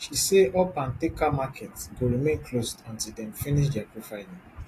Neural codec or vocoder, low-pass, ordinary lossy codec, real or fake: none; 14.4 kHz; AAC, 48 kbps; real